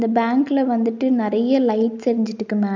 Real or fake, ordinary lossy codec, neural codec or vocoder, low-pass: real; none; none; 7.2 kHz